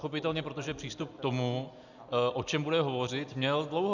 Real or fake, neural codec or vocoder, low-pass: real; none; 7.2 kHz